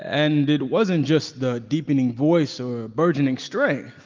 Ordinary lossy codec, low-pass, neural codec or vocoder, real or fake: Opus, 24 kbps; 7.2 kHz; none; real